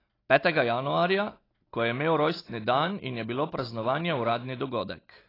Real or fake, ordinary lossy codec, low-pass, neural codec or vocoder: real; AAC, 24 kbps; 5.4 kHz; none